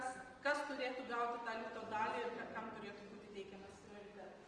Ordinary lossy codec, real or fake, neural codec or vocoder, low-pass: Opus, 24 kbps; real; none; 9.9 kHz